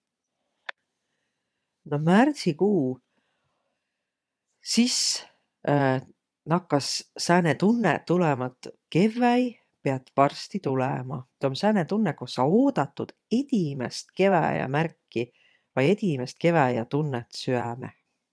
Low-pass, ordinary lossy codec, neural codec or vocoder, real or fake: none; none; vocoder, 22.05 kHz, 80 mel bands, Vocos; fake